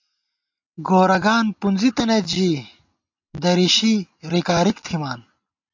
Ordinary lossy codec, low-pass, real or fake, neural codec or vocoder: AAC, 48 kbps; 7.2 kHz; real; none